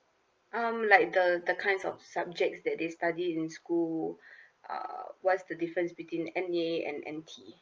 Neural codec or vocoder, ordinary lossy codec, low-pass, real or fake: none; Opus, 24 kbps; 7.2 kHz; real